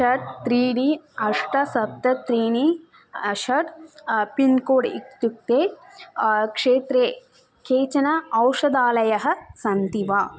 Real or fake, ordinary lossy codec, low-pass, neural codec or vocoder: real; none; none; none